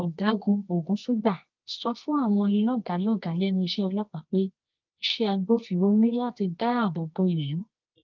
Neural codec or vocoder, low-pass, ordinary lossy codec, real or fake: codec, 24 kHz, 0.9 kbps, WavTokenizer, medium music audio release; 7.2 kHz; Opus, 24 kbps; fake